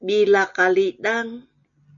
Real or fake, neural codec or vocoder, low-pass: real; none; 7.2 kHz